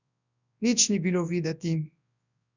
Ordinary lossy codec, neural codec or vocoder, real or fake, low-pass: none; codec, 24 kHz, 0.9 kbps, WavTokenizer, large speech release; fake; 7.2 kHz